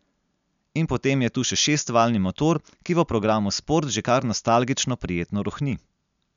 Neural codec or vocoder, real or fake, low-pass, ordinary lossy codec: none; real; 7.2 kHz; none